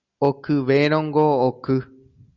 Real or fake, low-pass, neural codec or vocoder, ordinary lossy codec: real; 7.2 kHz; none; Opus, 64 kbps